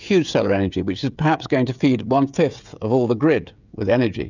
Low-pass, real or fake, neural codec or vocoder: 7.2 kHz; fake; codec, 16 kHz, 16 kbps, FreqCodec, smaller model